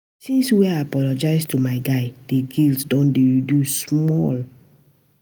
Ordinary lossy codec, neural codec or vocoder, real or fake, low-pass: none; none; real; none